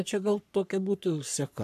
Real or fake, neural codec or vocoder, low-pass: fake; codec, 44.1 kHz, 3.4 kbps, Pupu-Codec; 14.4 kHz